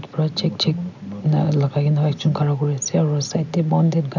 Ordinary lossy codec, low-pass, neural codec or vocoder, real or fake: none; 7.2 kHz; none; real